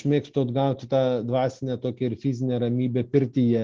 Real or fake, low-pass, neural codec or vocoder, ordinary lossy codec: real; 7.2 kHz; none; Opus, 16 kbps